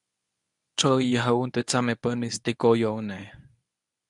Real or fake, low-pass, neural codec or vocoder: fake; 10.8 kHz; codec, 24 kHz, 0.9 kbps, WavTokenizer, medium speech release version 1